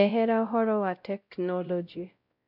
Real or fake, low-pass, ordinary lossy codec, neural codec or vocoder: fake; 5.4 kHz; none; codec, 16 kHz, 0.5 kbps, X-Codec, WavLM features, trained on Multilingual LibriSpeech